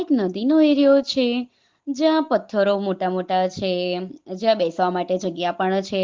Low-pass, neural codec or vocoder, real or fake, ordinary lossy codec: 7.2 kHz; none; real; Opus, 16 kbps